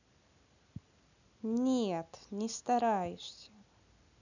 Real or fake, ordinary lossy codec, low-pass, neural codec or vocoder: real; none; 7.2 kHz; none